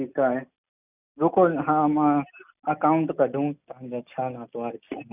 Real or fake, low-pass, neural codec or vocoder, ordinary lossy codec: real; 3.6 kHz; none; none